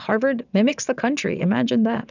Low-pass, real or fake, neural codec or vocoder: 7.2 kHz; fake; vocoder, 22.05 kHz, 80 mel bands, WaveNeXt